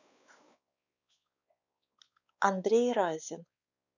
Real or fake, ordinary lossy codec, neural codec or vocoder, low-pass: fake; none; codec, 16 kHz, 4 kbps, X-Codec, WavLM features, trained on Multilingual LibriSpeech; 7.2 kHz